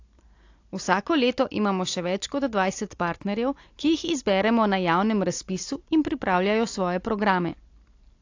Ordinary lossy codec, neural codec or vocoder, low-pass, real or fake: AAC, 48 kbps; none; 7.2 kHz; real